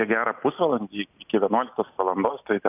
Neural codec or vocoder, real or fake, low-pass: none; real; 3.6 kHz